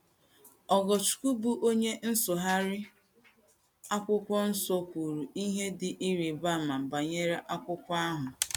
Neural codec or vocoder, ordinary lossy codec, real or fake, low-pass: none; none; real; none